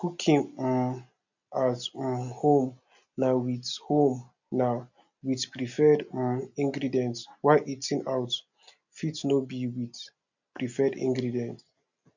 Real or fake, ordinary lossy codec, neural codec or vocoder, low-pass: real; none; none; 7.2 kHz